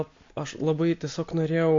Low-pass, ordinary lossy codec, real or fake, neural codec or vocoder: 7.2 kHz; MP3, 48 kbps; real; none